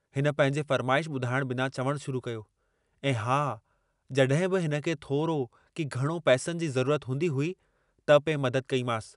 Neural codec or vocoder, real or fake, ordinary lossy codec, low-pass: none; real; none; 10.8 kHz